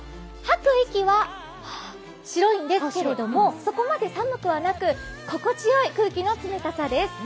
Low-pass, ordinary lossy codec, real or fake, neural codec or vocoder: none; none; real; none